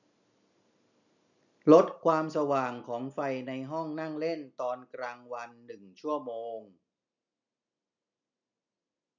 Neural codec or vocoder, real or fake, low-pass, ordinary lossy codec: none; real; 7.2 kHz; none